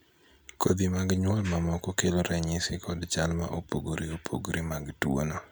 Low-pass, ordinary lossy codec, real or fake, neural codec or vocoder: none; none; real; none